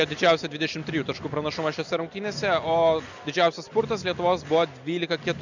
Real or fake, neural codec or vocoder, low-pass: real; none; 7.2 kHz